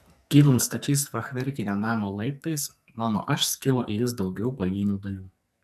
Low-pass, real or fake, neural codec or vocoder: 14.4 kHz; fake; codec, 44.1 kHz, 2.6 kbps, SNAC